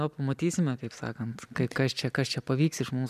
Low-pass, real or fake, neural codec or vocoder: 14.4 kHz; real; none